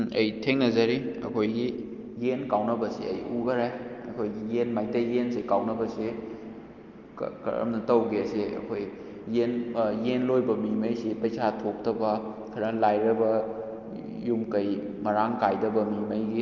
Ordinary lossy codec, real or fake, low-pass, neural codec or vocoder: Opus, 32 kbps; real; 7.2 kHz; none